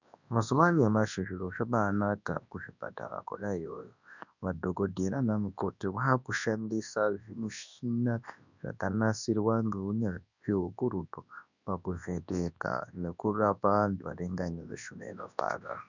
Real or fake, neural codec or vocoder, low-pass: fake; codec, 24 kHz, 0.9 kbps, WavTokenizer, large speech release; 7.2 kHz